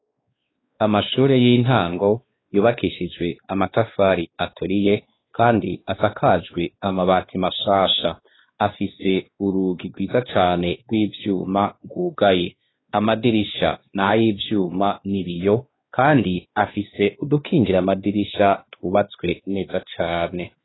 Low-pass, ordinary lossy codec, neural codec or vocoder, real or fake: 7.2 kHz; AAC, 16 kbps; codec, 16 kHz, 2 kbps, X-Codec, WavLM features, trained on Multilingual LibriSpeech; fake